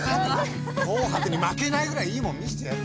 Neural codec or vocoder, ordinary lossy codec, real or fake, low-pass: none; none; real; none